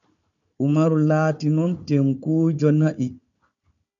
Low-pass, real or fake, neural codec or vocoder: 7.2 kHz; fake; codec, 16 kHz, 4 kbps, FunCodec, trained on Chinese and English, 50 frames a second